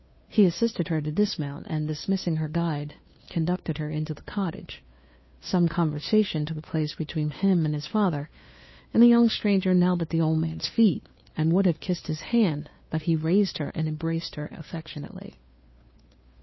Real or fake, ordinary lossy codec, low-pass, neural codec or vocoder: fake; MP3, 24 kbps; 7.2 kHz; codec, 16 kHz, 2 kbps, FunCodec, trained on Chinese and English, 25 frames a second